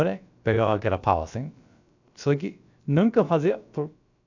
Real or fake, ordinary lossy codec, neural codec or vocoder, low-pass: fake; none; codec, 16 kHz, about 1 kbps, DyCAST, with the encoder's durations; 7.2 kHz